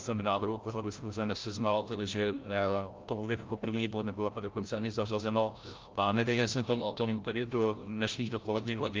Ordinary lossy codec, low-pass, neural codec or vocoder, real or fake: Opus, 24 kbps; 7.2 kHz; codec, 16 kHz, 0.5 kbps, FreqCodec, larger model; fake